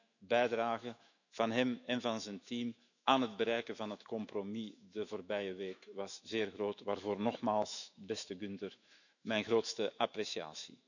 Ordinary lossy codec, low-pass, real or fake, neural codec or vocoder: none; 7.2 kHz; fake; autoencoder, 48 kHz, 128 numbers a frame, DAC-VAE, trained on Japanese speech